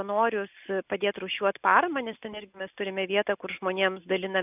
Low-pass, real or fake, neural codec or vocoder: 3.6 kHz; real; none